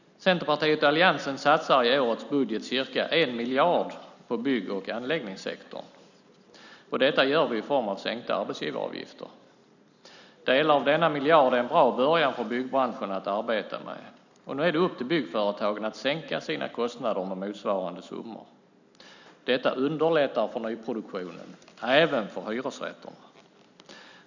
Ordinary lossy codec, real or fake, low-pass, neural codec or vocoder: none; real; 7.2 kHz; none